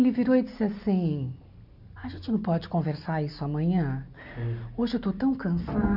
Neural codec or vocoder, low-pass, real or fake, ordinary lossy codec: none; 5.4 kHz; real; none